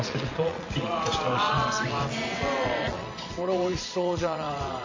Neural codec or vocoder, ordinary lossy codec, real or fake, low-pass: vocoder, 22.05 kHz, 80 mel bands, WaveNeXt; MP3, 32 kbps; fake; 7.2 kHz